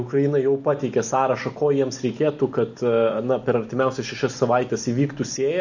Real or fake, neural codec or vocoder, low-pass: real; none; 7.2 kHz